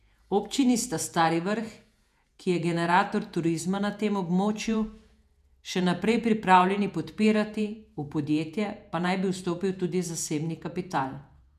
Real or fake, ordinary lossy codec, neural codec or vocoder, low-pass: fake; none; vocoder, 48 kHz, 128 mel bands, Vocos; 14.4 kHz